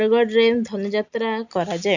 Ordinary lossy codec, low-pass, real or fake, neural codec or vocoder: none; 7.2 kHz; real; none